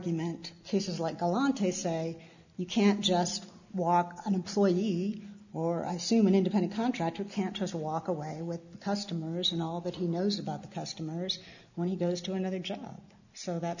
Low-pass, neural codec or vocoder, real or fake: 7.2 kHz; none; real